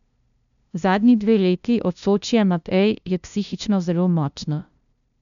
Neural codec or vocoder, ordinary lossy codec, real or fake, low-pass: codec, 16 kHz, 0.5 kbps, FunCodec, trained on LibriTTS, 25 frames a second; none; fake; 7.2 kHz